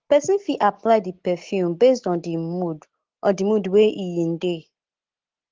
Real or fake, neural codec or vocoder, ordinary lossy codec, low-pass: real; none; Opus, 16 kbps; 7.2 kHz